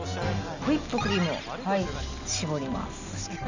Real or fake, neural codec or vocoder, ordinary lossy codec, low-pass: real; none; none; 7.2 kHz